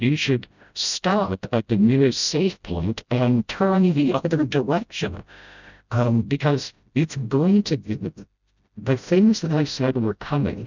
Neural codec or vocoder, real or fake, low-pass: codec, 16 kHz, 0.5 kbps, FreqCodec, smaller model; fake; 7.2 kHz